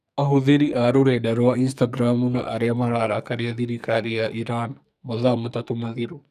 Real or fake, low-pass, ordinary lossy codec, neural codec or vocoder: fake; 14.4 kHz; none; codec, 44.1 kHz, 2.6 kbps, SNAC